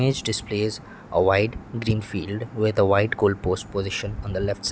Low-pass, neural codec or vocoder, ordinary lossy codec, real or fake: none; none; none; real